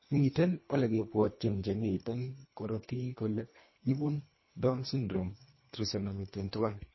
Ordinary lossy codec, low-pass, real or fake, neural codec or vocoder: MP3, 24 kbps; 7.2 kHz; fake; codec, 24 kHz, 1.5 kbps, HILCodec